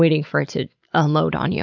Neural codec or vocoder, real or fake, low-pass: none; real; 7.2 kHz